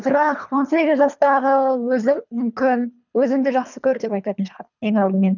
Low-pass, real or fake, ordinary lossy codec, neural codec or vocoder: 7.2 kHz; fake; none; codec, 24 kHz, 3 kbps, HILCodec